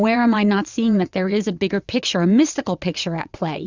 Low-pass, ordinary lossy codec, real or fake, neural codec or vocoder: 7.2 kHz; Opus, 64 kbps; fake; vocoder, 22.05 kHz, 80 mel bands, WaveNeXt